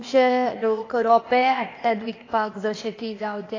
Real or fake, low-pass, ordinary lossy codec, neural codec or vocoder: fake; 7.2 kHz; AAC, 32 kbps; codec, 16 kHz, 0.8 kbps, ZipCodec